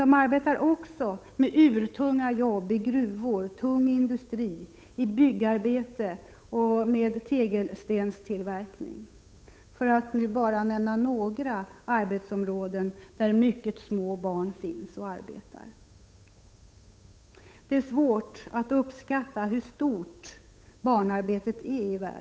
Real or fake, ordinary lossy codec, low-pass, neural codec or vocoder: fake; none; none; codec, 16 kHz, 8 kbps, FunCodec, trained on Chinese and English, 25 frames a second